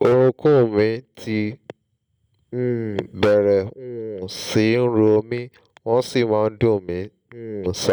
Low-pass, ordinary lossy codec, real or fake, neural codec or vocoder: none; none; real; none